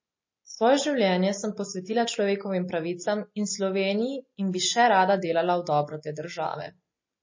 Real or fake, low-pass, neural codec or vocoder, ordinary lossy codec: real; 7.2 kHz; none; MP3, 32 kbps